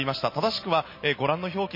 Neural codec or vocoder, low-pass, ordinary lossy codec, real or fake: none; 5.4 kHz; MP3, 24 kbps; real